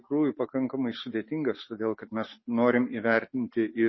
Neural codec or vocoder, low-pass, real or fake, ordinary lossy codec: none; 7.2 kHz; real; MP3, 24 kbps